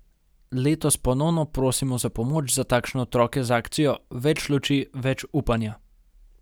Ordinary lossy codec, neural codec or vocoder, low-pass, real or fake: none; none; none; real